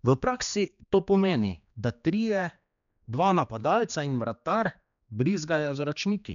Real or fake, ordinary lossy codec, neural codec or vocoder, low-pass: fake; none; codec, 16 kHz, 2 kbps, X-Codec, HuBERT features, trained on general audio; 7.2 kHz